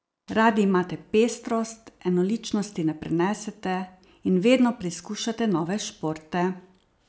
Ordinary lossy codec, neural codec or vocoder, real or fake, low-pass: none; none; real; none